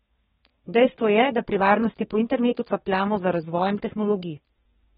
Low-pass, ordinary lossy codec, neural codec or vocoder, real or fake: 14.4 kHz; AAC, 16 kbps; codec, 32 kHz, 1.9 kbps, SNAC; fake